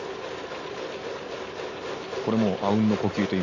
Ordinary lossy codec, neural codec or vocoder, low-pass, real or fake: none; none; 7.2 kHz; real